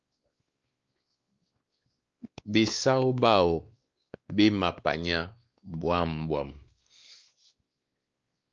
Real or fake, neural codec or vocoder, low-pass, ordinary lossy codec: fake; codec, 16 kHz, 2 kbps, X-Codec, WavLM features, trained on Multilingual LibriSpeech; 7.2 kHz; Opus, 24 kbps